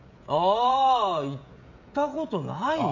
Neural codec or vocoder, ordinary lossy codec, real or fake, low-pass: codec, 16 kHz, 16 kbps, FreqCodec, smaller model; none; fake; 7.2 kHz